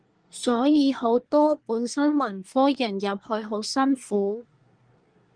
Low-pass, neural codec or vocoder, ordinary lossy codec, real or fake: 9.9 kHz; codec, 24 kHz, 1 kbps, SNAC; Opus, 24 kbps; fake